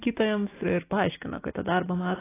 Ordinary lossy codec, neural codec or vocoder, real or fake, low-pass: AAC, 16 kbps; none; real; 3.6 kHz